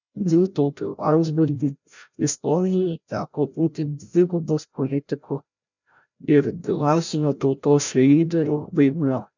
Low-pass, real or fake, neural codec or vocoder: 7.2 kHz; fake; codec, 16 kHz, 0.5 kbps, FreqCodec, larger model